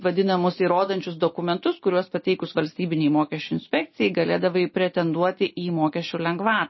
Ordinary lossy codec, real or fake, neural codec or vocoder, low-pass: MP3, 24 kbps; real; none; 7.2 kHz